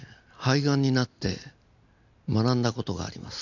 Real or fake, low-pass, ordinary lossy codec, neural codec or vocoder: real; 7.2 kHz; none; none